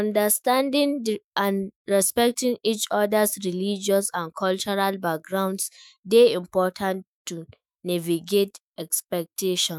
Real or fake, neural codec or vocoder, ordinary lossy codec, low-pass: fake; autoencoder, 48 kHz, 128 numbers a frame, DAC-VAE, trained on Japanese speech; none; none